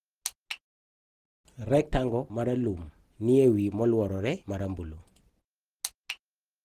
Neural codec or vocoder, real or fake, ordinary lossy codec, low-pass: none; real; Opus, 16 kbps; 14.4 kHz